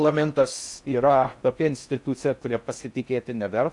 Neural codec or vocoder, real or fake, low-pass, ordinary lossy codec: codec, 16 kHz in and 24 kHz out, 0.6 kbps, FocalCodec, streaming, 4096 codes; fake; 10.8 kHz; AAC, 64 kbps